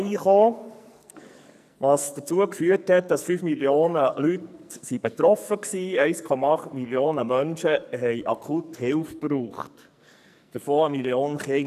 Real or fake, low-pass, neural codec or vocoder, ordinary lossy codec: fake; 14.4 kHz; codec, 44.1 kHz, 2.6 kbps, SNAC; none